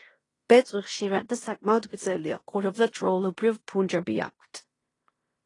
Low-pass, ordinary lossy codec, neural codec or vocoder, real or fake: 10.8 kHz; AAC, 32 kbps; codec, 16 kHz in and 24 kHz out, 0.9 kbps, LongCat-Audio-Codec, fine tuned four codebook decoder; fake